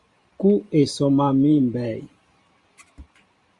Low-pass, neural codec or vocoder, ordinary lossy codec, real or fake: 10.8 kHz; vocoder, 44.1 kHz, 128 mel bands every 512 samples, BigVGAN v2; Opus, 64 kbps; fake